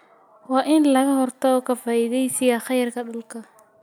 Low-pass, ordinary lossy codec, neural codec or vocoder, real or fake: none; none; none; real